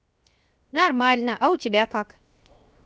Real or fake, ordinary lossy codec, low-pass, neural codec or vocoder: fake; none; none; codec, 16 kHz, 0.7 kbps, FocalCodec